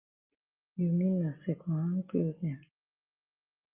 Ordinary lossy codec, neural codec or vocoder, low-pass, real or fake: Opus, 32 kbps; none; 3.6 kHz; real